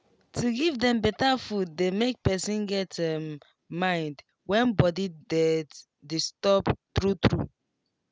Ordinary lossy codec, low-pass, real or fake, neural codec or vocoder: none; none; real; none